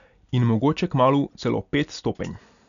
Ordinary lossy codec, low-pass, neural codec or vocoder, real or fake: none; 7.2 kHz; none; real